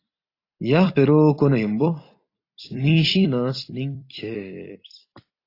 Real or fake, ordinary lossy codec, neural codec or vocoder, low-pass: fake; AAC, 32 kbps; vocoder, 44.1 kHz, 128 mel bands every 256 samples, BigVGAN v2; 5.4 kHz